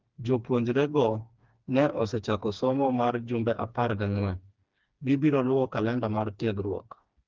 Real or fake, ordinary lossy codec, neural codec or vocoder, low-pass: fake; Opus, 32 kbps; codec, 16 kHz, 2 kbps, FreqCodec, smaller model; 7.2 kHz